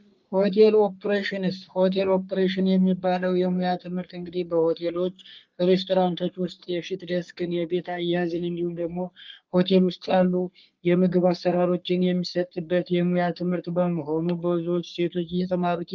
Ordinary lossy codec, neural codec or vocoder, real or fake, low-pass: Opus, 32 kbps; codec, 44.1 kHz, 3.4 kbps, Pupu-Codec; fake; 7.2 kHz